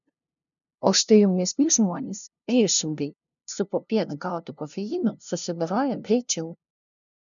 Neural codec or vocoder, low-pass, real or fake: codec, 16 kHz, 0.5 kbps, FunCodec, trained on LibriTTS, 25 frames a second; 7.2 kHz; fake